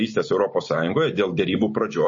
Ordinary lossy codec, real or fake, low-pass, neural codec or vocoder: MP3, 32 kbps; real; 7.2 kHz; none